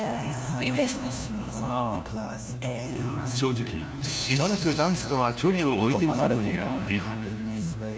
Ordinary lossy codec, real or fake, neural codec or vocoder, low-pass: none; fake; codec, 16 kHz, 1 kbps, FunCodec, trained on LibriTTS, 50 frames a second; none